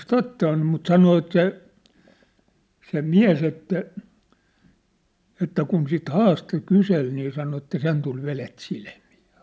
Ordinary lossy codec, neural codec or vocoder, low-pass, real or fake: none; none; none; real